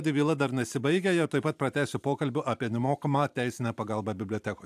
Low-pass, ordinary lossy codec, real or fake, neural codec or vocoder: 14.4 kHz; AAC, 96 kbps; fake; vocoder, 44.1 kHz, 128 mel bands every 512 samples, BigVGAN v2